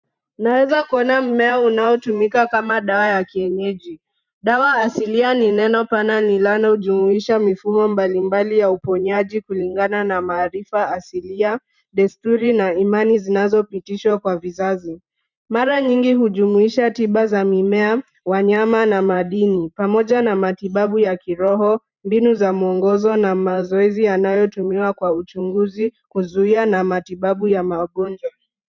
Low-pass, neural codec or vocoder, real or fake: 7.2 kHz; vocoder, 44.1 kHz, 128 mel bands every 512 samples, BigVGAN v2; fake